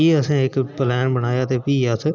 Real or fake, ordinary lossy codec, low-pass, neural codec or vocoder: real; none; 7.2 kHz; none